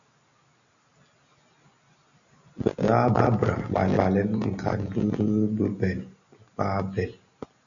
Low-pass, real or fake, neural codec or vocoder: 7.2 kHz; real; none